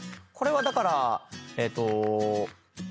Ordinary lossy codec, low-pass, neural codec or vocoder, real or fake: none; none; none; real